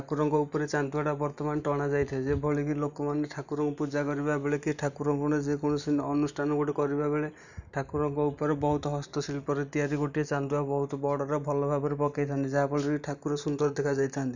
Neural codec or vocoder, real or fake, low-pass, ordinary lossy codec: none; real; 7.2 kHz; none